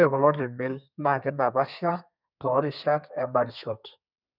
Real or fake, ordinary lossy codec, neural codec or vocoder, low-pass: fake; none; codec, 32 kHz, 1.9 kbps, SNAC; 5.4 kHz